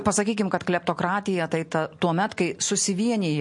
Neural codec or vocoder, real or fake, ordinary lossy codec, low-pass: none; real; MP3, 48 kbps; 10.8 kHz